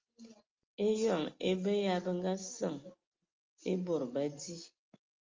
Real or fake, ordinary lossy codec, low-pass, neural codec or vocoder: real; Opus, 32 kbps; 7.2 kHz; none